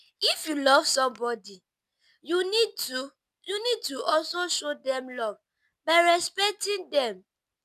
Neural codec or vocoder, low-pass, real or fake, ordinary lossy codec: none; 14.4 kHz; real; none